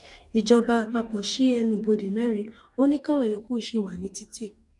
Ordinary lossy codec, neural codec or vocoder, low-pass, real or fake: none; codec, 44.1 kHz, 2.6 kbps, DAC; 10.8 kHz; fake